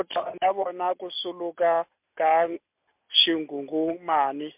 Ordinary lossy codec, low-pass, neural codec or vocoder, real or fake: MP3, 32 kbps; 3.6 kHz; none; real